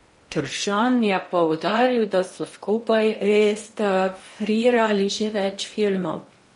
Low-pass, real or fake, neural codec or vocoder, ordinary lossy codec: 10.8 kHz; fake; codec, 16 kHz in and 24 kHz out, 0.8 kbps, FocalCodec, streaming, 65536 codes; MP3, 48 kbps